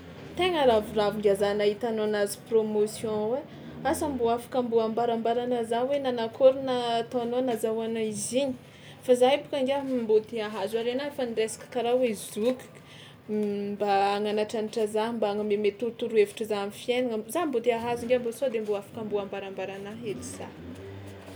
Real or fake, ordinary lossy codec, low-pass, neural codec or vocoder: real; none; none; none